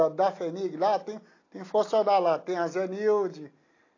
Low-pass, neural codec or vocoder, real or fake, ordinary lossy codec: 7.2 kHz; none; real; AAC, 32 kbps